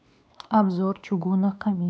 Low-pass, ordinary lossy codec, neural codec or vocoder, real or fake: none; none; codec, 16 kHz, 2 kbps, X-Codec, WavLM features, trained on Multilingual LibriSpeech; fake